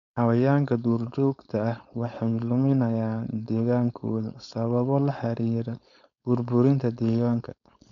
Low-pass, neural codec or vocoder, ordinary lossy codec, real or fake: 7.2 kHz; codec, 16 kHz, 4.8 kbps, FACodec; none; fake